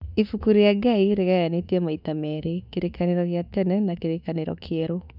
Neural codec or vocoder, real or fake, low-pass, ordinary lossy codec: autoencoder, 48 kHz, 32 numbers a frame, DAC-VAE, trained on Japanese speech; fake; 5.4 kHz; none